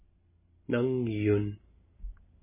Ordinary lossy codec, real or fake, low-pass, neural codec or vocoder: MP3, 16 kbps; real; 3.6 kHz; none